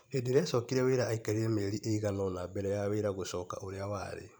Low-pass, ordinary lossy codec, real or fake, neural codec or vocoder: none; none; fake; vocoder, 44.1 kHz, 128 mel bands every 512 samples, BigVGAN v2